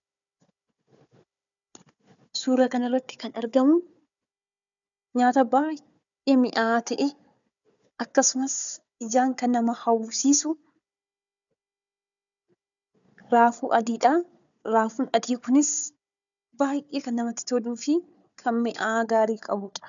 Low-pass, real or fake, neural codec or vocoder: 7.2 kHz; fake; codec, 16 kHz, 4 kbps, FunCodec, trained on Chinese and English, 50 frames a second